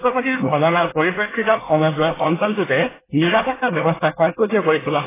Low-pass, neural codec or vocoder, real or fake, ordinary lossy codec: 3.6 kHz; codec, 24 kHz, 1 kbps, SNAC; fake; AAC, 16 kbps